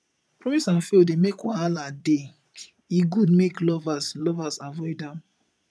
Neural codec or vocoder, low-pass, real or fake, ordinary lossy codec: vocoder, 22.05 kHz, 80 mel bands, Vocos; none; fake; none